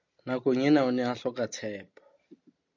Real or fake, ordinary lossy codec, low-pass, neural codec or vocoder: real; MP3, 64 kbps; 7.2 kHz; none